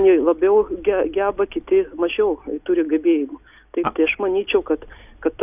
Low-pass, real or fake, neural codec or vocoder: 3.6 kHz; real; none